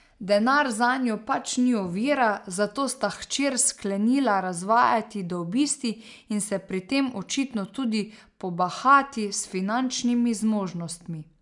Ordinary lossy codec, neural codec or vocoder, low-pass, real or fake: none; none; 10.8 kHz; real